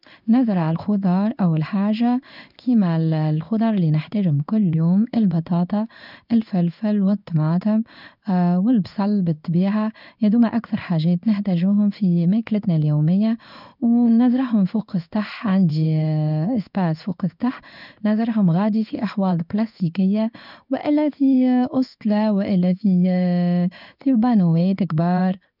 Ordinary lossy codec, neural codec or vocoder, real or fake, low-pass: none; codec, 16 kHz in and 24 kHz out, 1 kbps, XY-Tokenizer; fake; 5.4 kHz